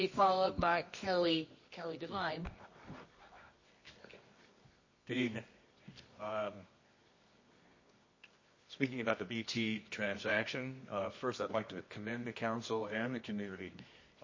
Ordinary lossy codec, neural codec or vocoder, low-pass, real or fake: MP3, 32 kbps; codec, 24 kHz, 0.9 kbps, WavTokenizer, medium music audio release; 7.2 kHz; fake